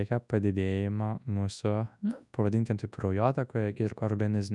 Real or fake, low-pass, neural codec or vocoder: fake; 10.8 kHz; codec, 24 kHz, 0.9 kbps, WavTokenizer, large speech release